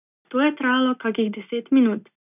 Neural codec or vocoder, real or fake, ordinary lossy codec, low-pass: none; real; none; 3.6 kHz